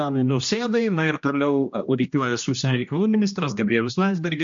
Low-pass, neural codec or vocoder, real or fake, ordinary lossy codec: 7.2 kHz; codec, 16 kHz, 1 kbps, X-Codec, HuBERT features, trained on general audio; fake; MP3, 48 kbps